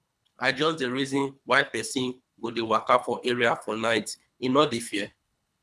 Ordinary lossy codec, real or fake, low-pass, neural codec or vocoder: none; fake; none; codec, 24 kHz, 3 kbps, HILCodec